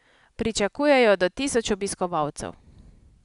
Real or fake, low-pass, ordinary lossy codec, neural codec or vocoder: real; 10.8 kHz; none; none